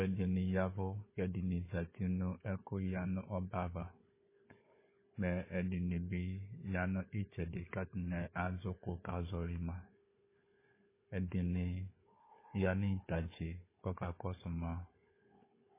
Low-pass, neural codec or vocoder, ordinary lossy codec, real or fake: 3.6 kHz; codec, 16 kHz, 4 kbps, FunCodec, trained on Chinese and English, 50 frames a second; MP3, 16 kbps; fake